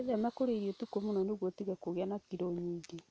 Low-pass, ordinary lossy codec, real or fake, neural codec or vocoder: none; none; real; none